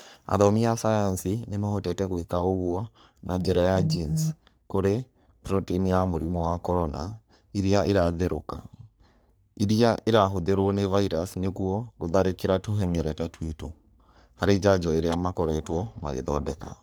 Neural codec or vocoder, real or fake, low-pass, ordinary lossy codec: codec, 44.1 kHz, 3.4 kbps, Pupu-Codec; fake; none; none